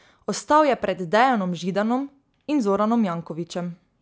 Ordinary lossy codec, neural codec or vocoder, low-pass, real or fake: none; none; none; real